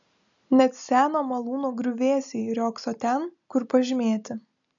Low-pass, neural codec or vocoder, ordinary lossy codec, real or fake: 7.2 kHz; none; MP3, 96 kbps; real